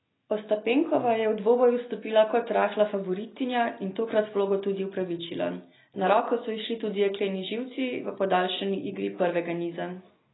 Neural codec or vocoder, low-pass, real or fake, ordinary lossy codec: none; 7.2 kHz; real; AAC, 16 kbps